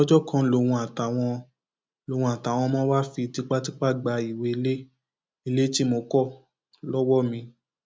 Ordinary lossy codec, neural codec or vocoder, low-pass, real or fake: none; none; none; real